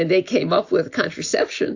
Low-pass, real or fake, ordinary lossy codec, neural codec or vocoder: 7.2 kHz; real; AAC, 48 kbps; none